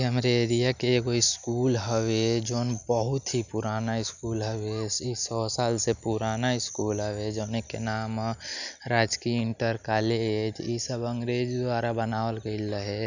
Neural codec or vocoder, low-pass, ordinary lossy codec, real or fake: none; 7.2 kHz; none; real